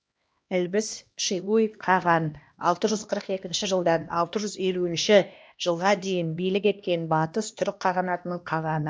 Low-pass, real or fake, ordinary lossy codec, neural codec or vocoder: none; fake; none; codec, 16 kHz, 1 kbps, X-Codec, HuBERT features, trained on LibriSpeech